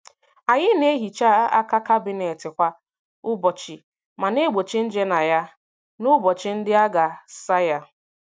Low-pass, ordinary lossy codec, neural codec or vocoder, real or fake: none; none; none; real